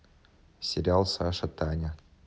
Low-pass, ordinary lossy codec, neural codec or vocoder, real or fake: none; none; none; real